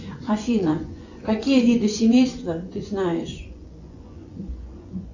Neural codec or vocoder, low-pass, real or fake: vocoder, 24 kHz, 100 mel bands, Vocos; 7.2 kHz; fake